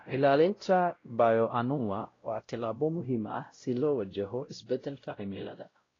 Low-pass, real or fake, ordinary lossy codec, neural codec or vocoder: 7.2 kHz; fake; AAC, 32 kbps; codec, 16 kHz, 0.5 kbps, X-Codec, WavLM features, trained on Multilingual LibriSpeech